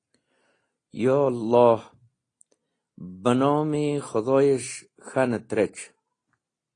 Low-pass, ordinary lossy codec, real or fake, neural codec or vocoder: 9.9 kHz; AAC, 32 kbps; real; none